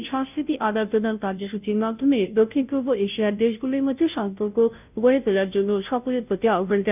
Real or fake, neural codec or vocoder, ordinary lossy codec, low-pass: fake; codec, 16 kHz, 0.5 kbps, FunCodec, trained on Chinese and English, 25 frames a second; none; 3.6 kHz